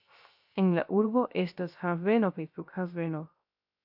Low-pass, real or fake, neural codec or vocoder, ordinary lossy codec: 5.4 kHz; fake; codec, 16 kHz, 0.3 kbps, FocalCodec; AAC, 48 kbps